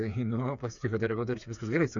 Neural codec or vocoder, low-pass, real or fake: codec, 16 kHz, 4 kbps, FreqCodec, smaller model; 7.2 kHz; fake